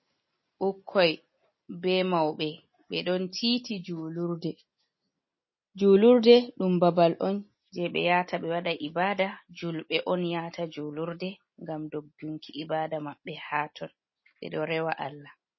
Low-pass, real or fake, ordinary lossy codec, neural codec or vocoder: 7.2 kHz; real; MP3, 24 kbps; none